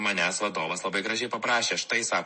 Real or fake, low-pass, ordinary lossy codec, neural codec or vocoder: real; 10.8 kHz; MP3, 32 kbps; none